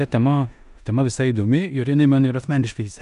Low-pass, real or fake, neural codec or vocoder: 10.8 kHz; fake; codec, 16 kHz in and 24 kHz out, 0.9 kbps, LongCat-Audio-Codec, four codebook decoder